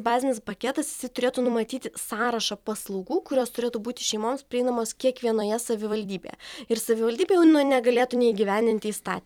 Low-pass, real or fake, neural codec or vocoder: 19.8 kHz; fake; vocoder, 44.1 kHz, 128 mel bands every 256 samples, BigVGAN v2